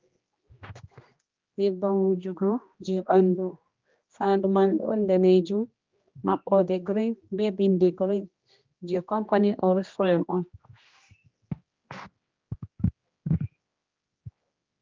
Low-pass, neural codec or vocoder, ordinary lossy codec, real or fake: 7.2 kHz; codec, 16 kHz, 1 kbps, X-Codec, HuBERT features, trained on general audio; Opus, 32 kbps; fake